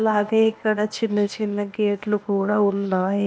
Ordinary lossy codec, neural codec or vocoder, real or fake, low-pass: none; codec, 16 kHz, 0.8 kbps, ZipCodec; fake; none